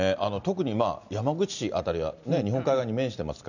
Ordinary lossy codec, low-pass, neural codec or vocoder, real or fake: none; 7.2 kHz; none; real